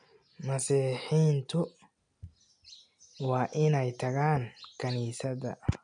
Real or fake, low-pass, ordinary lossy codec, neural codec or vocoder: real; 9.9 kHz; none; none